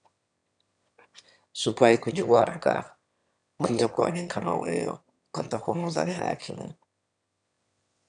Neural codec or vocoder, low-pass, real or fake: autoencoder, 22.05 kHz, a latent of 192 numbers a frame, VITS, trained on one speaker; 9.9 kHz; fake